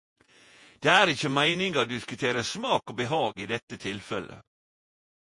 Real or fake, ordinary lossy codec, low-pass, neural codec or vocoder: fake; MP3, 48 kbps; 10.8 kHz; vocoder, 48 kHz, 128 mel bands, Vocos